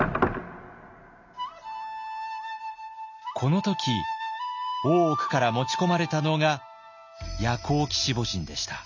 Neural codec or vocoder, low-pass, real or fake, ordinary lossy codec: none; 7.2 kHz; real; none